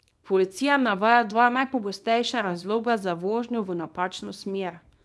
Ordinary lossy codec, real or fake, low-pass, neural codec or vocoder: none; fake; none; codec, 24 kHz, 0.9 kbps, WavTokenizer, small release